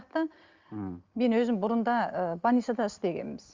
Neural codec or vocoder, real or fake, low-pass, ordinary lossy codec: none; real; 7.2 kHz; Opus, 32 kbps